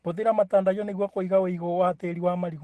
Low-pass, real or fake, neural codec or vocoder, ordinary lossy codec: 14.4 kHz; real; none; Opus, 16 kbps